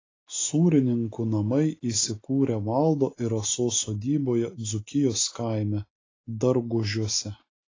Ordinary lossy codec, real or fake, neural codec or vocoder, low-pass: AAC, 32 kbps; real; none; 7.2 kHz